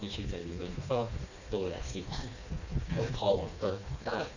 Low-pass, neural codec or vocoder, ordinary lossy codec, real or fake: 7.2 kHz; codec, 16 kHz, 2 kbps, FreqCodec, smaller model; none; fake